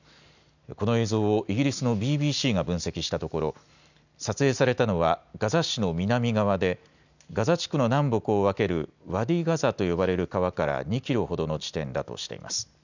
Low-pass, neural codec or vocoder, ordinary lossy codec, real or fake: 7.2 kHz; none; none; real